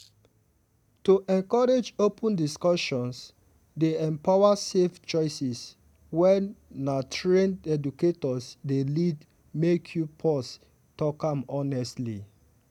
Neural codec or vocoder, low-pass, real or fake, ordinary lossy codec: none; 19.8 kHz; real; none